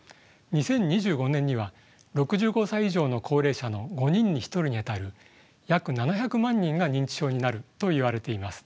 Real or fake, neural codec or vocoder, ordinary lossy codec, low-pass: real; none; none; none